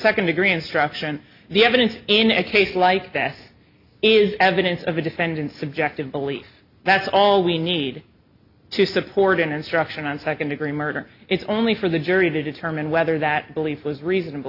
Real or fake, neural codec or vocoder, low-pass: real; none; 5.4 kHz